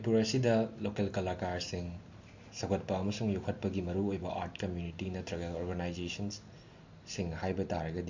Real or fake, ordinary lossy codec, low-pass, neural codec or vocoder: real; MP3, 48 kbps; 7.2 kHz; none